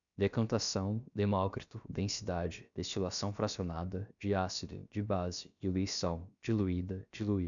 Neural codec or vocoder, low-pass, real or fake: codec, 16 kHz, about 1 kbps, DyCAST, with the encoder's durations; 7.2 kHz; fake